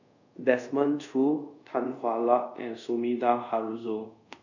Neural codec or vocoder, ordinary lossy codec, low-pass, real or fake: codec, 24 kHz, 0.5 kbps, DualCodec; none; 7.2 kHz; fake